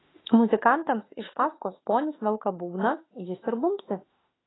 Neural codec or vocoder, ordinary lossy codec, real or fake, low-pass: autoencoder, 48 kHz, 32 numbers a frame, DAC-VAE, trained on Japanese speech; AAC, 16 kbps; fake; 7.2 kHz